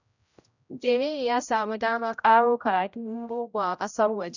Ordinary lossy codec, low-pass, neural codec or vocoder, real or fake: none; 7.2 kHz; codec, 16 kHz, 0.5 kbps, X-Codec, HuBERT features, trained on general audio; fake